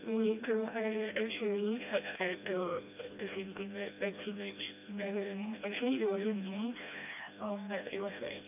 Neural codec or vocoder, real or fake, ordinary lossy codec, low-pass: codec, 16 kHz, 1 kbps, FreqCodec, smaller model; fake; none; 3.6 kHz